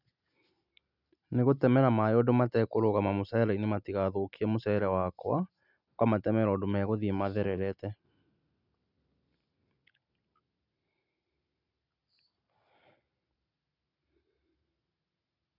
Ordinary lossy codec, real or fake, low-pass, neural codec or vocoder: none; real; 5.4 kHz; none